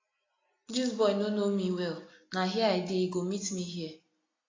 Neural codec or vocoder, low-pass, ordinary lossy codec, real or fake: none; 7.2 kHz; AAC, 32 kbps; real